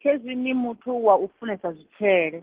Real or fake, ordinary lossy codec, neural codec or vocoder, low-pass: real; Opus, 16 kbps; none; 3.6 kHz